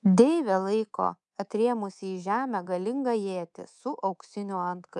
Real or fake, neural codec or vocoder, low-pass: fake; codec, 24 kHz, 3.1 kbps, DualCodec; 10.8 kHz